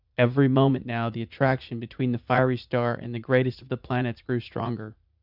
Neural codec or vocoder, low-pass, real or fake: vocoder, 44.1 kHz, 80 mel bands, Vocos; 5.4 kHz; fake